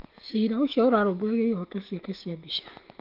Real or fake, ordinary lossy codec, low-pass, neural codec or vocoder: fake; Opus, 32 kbps; 5.4 kHz; autoencoder, 48 kHz, 128 numbers a frame, DAC-VAE, trained on Japanese speech